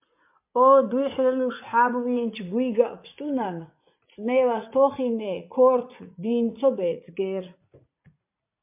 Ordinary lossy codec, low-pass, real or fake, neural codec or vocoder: MP3, 32 kbps; 3.6 kHz; fake; vocoder, 44.1 kHz, 80 mel bands, Vocos